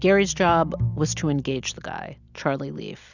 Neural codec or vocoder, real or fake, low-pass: none; real; 7.2 kHz